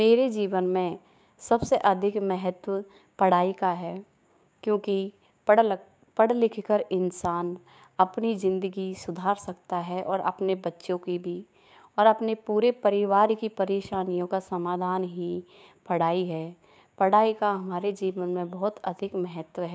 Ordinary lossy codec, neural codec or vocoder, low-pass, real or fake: none; codec, 16 kHz, 6 kbps, DAC; none; fake